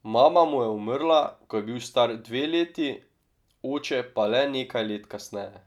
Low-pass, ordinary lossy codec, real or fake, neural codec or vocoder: 19.8 kHz; none; real; none